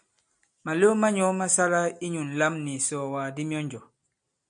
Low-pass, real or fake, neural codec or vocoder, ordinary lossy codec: 9.9 kHz; real; none; MP3, 64 kbps